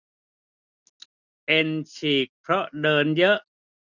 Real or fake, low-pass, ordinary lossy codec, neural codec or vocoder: real; 7.2 kHz; none; none